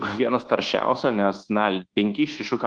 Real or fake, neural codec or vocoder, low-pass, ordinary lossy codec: fake; codec, 24 kHz, 1.2 kbps, DualCodec; 9.9 kHz; Opus, 16 kbps